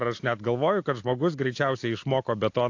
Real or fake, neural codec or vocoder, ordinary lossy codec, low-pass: real; none; AAC, 48 kbps; 7.2 kHz